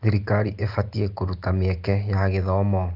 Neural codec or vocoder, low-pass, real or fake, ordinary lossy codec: none; 5.4 kHz; real; Opus, 32 kbps